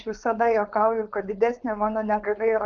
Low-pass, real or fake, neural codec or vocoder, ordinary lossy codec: 7.2 kHz; fake; codec, 16 kHz, 4.8 kbps, FACodec; Opus, 24 kbps